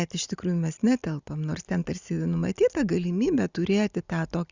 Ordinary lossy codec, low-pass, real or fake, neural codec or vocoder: Opus, 64 kbps; 7.2 kHz; real; none